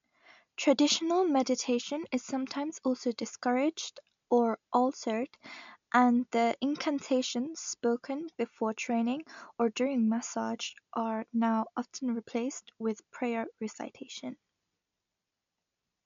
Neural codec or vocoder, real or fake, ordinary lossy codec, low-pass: none; real; MP3, 64 kbps; 7.2 kHz